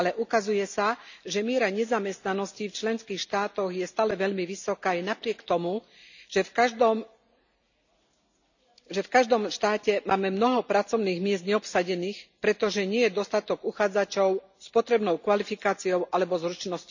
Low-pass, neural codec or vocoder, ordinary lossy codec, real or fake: 7.2 kHz; none; none; real